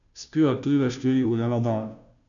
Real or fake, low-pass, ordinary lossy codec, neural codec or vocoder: fake; 7.2 kHz; none; codec, 16 kHz, 0.5 kbps, FunCodec, trained on Chinese and English, 25 frames a second